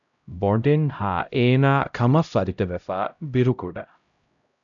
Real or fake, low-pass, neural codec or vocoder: fake; 7.2 kHz; codec, 16 kHz, 0.5 kbps, X-Codec, HuBERT features, trained on LibriSpeech